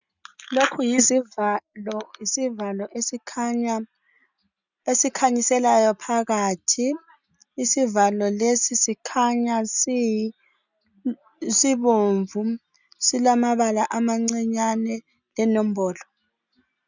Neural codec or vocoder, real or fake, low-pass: none; real; 7.2 kHz